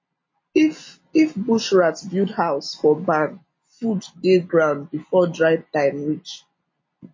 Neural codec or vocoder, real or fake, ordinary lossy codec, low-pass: none; real; MP3, 32 kbps; 7.2 kHz